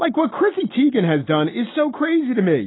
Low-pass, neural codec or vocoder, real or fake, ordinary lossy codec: 7.2 kHz; none; real; AAC, 16 kbps